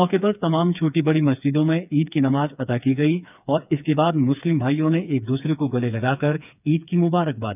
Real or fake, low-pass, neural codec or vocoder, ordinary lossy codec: fake; 3.6 kHz; codec, 16 kHz, 4 kbps, FreqCodec, smaller model; none